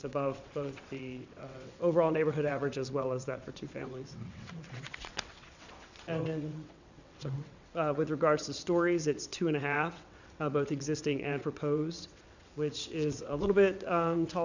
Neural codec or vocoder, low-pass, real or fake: vocoder, 22.05 kHz, 80 mel bands, WaveNeXt; 7.2 kHz; fake